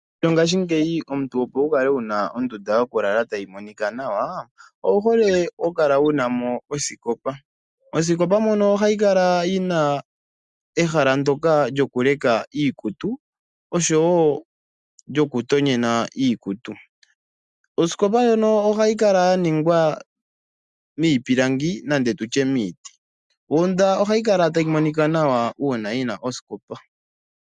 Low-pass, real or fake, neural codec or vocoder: 10.8 kHz; real; none